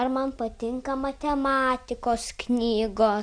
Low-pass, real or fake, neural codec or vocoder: 9.9 kHz; real; none